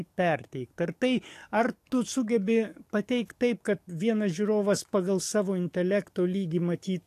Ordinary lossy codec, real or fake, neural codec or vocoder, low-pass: AAC, 64 kbps; fake; codec, 44.1 kHz, 7.8 kbps, DAC; 14.4 kHz